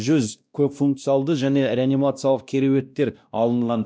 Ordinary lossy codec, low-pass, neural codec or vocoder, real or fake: none; none; codec, 16 kHz, 1 kbps, X-Codec, WavLM features, trained on Multilingual LibriSpeech; fake